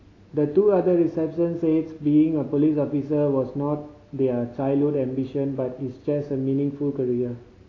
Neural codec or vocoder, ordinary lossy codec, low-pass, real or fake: none; AAC, 32 kbps; 7.2 kHz; real